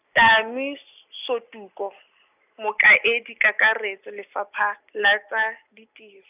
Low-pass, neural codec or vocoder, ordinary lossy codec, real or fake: 3.6 kHz; none; none; real